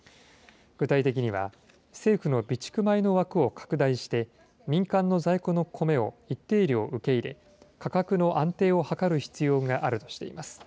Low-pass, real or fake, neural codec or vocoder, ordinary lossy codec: none; real; none; none